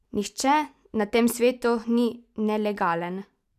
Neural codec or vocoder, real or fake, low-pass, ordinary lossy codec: none; real; 14.4 kHz; none